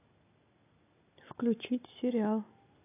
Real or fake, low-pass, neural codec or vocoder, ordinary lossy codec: real; 3.6 kHz; none; AAC, 24 kbps